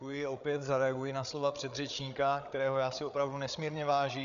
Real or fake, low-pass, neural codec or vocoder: fake; 7.2 kHz; codec, 16 kHz, 8 kbps, FreqCodec, larger model